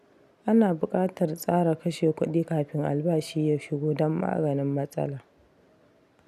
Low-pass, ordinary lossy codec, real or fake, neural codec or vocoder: 14.4 kHz; none; real; none